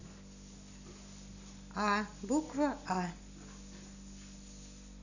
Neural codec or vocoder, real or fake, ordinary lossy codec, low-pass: none; real; none; 7.2 kHz